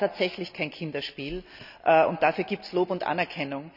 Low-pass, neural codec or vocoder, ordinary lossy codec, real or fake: 5.4 kHz; none; none; real